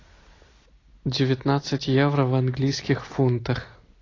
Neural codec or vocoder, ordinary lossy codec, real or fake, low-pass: none; AAC, 32 kbps; real; 7.2 kHz